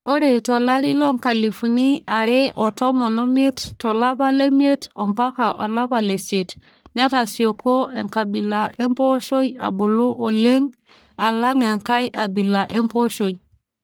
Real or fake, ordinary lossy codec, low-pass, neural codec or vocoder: fake; none; none; codec, 44.1 kHz, 1.7 kbps, Pupu-Codec